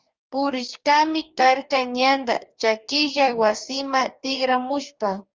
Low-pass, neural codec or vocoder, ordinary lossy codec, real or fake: 7.2 kHz; codec, 44.1 kHz, 2.6 kbps, DAC; Opus, 32 kbps; fake